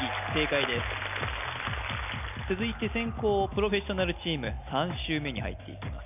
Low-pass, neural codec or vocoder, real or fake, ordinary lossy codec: 3.6 kHz; none; real; none